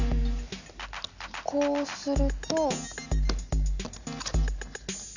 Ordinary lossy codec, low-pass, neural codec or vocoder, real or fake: none; 7.2 kHz; none; real